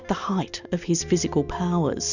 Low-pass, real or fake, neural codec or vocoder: 7.2 kHz; real; none